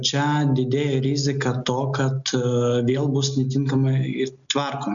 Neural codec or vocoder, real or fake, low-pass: none; real; 7.2 kHz